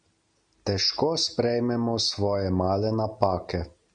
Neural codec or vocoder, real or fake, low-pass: none; real; 9.9 kHz